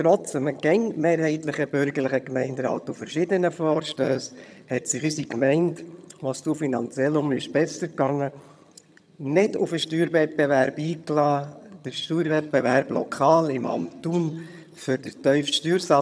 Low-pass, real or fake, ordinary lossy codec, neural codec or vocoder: none; fake; none; vocoder, 22.05 kHz, 80 mel bands, HiFi-GAN